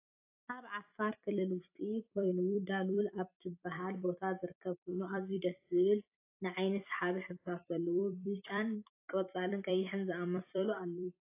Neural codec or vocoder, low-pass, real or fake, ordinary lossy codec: vocoder, 44.1 kHz, 128 mel bands every 256 samples, BigVGAN v2; 3.6 kHz; fake; AAC, 24 kbps